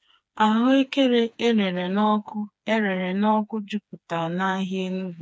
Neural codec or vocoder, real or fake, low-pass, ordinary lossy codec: codec, 16 kHz, 4 kbps, FreqCodec, smaller model; fake; none; none